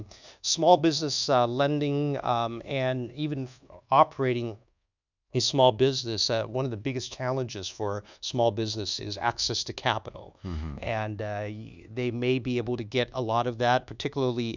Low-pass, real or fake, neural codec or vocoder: 7.2 kHz; fake; codec, 24 kHz, 1.2 kbps, DualCodec